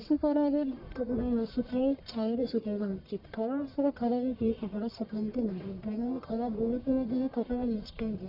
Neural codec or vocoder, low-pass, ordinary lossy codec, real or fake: codec, 44.1 kHz, 1.7 kbps, Pupu-Codec; 5.4 kHz; none; fake